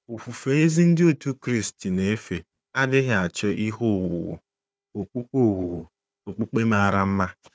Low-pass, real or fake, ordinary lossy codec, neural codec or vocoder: none; fake; none; codec, 16 kHz, 4 kbps, FunCodec, trained on Chinese and English, 50 frames a second